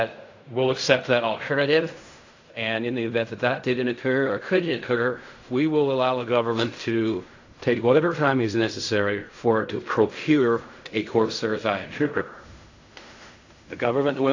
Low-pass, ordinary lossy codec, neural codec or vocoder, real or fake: 7.2 kHz; AAC, 48 kbps; codec, 16 kHz in and 24 kHz out, 0.4 kbps, LongCat-Audio-Codec, fine tuned four codebook decoder; fake